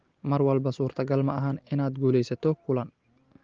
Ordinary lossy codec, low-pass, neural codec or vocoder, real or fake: Opus, 16 kbps; 7.2 kHz; none; real